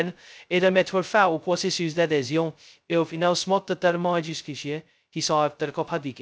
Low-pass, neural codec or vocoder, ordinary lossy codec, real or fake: none; codec, 16 kHz, 0.2 kbps, FocalCodec; none; fake